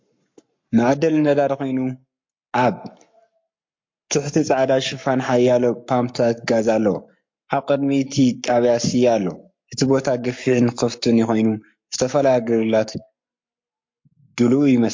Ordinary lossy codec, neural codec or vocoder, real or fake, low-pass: MP3, 48 kbps; codec, 44.1 kHz, 7.8 kbps, Pupu-Codec; fake; 7.2 kHz